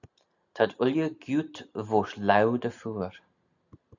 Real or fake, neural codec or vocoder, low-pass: real; none; 7.2 kHz